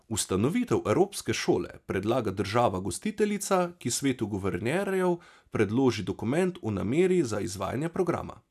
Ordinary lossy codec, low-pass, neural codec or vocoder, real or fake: none; 14.4 kHz; none; real